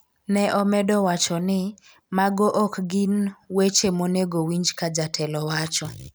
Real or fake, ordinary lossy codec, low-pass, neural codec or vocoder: real; none; none; none